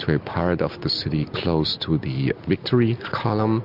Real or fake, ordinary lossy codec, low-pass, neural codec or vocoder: real; MP3, 48 kbps; 5.4 kHz; none